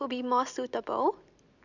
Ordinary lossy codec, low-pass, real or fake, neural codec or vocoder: none; 7.2 kHz; real; none